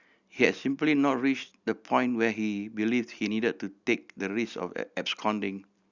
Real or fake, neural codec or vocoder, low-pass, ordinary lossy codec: real; none; 7.2 kHz; Opus, 64 kbps